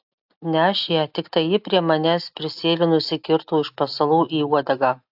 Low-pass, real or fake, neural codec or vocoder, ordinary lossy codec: 5.4 kHz; real; none; AAC, 48 kbps